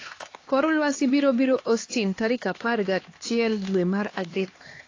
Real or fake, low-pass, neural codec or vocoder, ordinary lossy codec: fake; 7.2 kHz; codec, 16 kHz, 2 kbps, X-Codec, HuBERT features, trained on LibriSpeech; AAC, 32 kbps